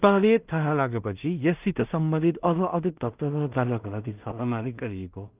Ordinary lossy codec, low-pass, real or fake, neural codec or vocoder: Opus, 24 kbps; 3.6 kHz; fake; codec, 16 kHz in and 24 kHz out, 0.4 kbps, LongCat-Audio-Codec, two codebook decoder